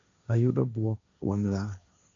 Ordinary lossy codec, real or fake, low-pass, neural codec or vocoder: none; fake; 7.2 kHz; codec, 16 kHz, 1.1 kbps, Voila-Tokenizer